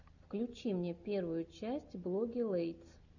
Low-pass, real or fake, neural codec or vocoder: 7.2 kHz; real; none